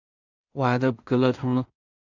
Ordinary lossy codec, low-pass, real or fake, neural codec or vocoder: Opus, 64 kbps; 7.2 kHz; fake; codec, 16 kHz in and 24 kHz out, 0.4 kbps, LongCat-Audio-Codec, two codebook decoder